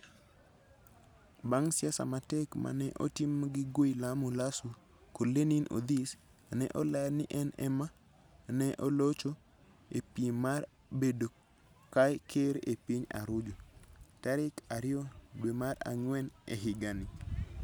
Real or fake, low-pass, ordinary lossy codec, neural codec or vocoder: real; none; none; none